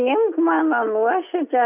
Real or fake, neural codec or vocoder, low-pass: real; none; 3.6 kHz